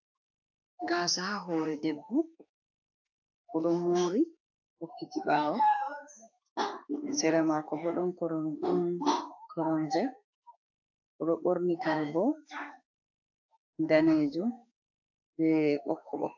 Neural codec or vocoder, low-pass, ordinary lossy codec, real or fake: autoencoder, 48 kHz, 32 numbers a frame, DAC-VAE, trained on Japanese speech; 7.2 kHz; AAC, 48 kbps; fake